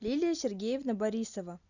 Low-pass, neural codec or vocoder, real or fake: 7.2 kHz; none; real